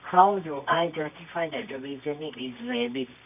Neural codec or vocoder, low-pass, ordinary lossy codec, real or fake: codec, 24 kHz, 0.9 kbps, WavTokenizer, medium music audio release; 3.6 kHz; none; fake